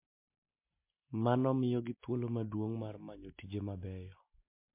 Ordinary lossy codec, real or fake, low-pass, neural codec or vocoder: MP3, 24 kbps; real; 3.6 kHz; none